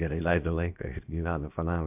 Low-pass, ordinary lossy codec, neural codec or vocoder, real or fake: 3.6 kHz; AAC, 32 kbps; codec, 16 kHz, about 1 kbps, DyCAST, with the encoder's durations; fake